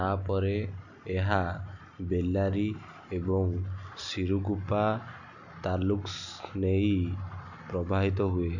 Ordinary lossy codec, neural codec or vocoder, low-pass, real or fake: none; none; 7.2 kHz; real